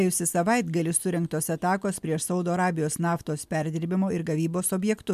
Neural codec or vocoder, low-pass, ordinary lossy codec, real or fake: none; 14.4 kHz; MP3, 96 kbps; real